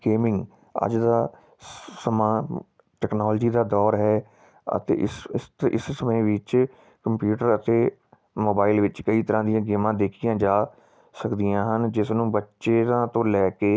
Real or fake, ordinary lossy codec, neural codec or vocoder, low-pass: real; none; none; none